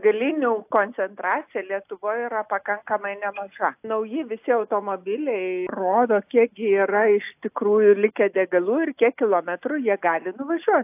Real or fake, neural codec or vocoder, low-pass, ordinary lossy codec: real; none; 3.6 kHz; AAC, 32 kbps